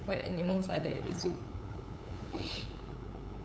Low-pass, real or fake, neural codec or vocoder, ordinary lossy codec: none; fake; codec, 16 kHz, 8 kbps, FunCodec, trained on LibriTTS, 25 frames a second; none